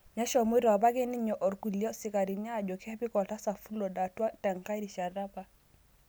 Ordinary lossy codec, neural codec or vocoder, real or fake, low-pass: none; none; real; none